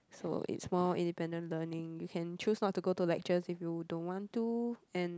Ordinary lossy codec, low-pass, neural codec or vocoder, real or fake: none; none; none; real